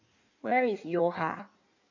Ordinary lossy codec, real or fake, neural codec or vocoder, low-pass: none; fake; codec, 16 kHz in and 24 kHz out, 1.1 kbps, FireRedTTS-2 codec; 7.2 kHz